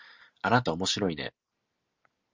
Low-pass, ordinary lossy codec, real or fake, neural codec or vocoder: 7.2 kHz; Opus, 32 kbps; real; none